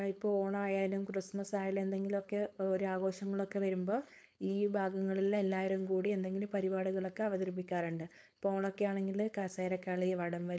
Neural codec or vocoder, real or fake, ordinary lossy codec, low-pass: codec, 16 kHz, 4.8 kbps, FACodec; fake; none; none